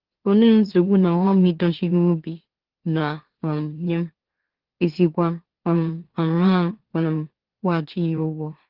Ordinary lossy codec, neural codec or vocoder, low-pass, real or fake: Opus, 16 kbps; autoencoder, 44.1 kHz, a latent of 192 numbers a frame, MeloTTS; 5.4 kHz; fake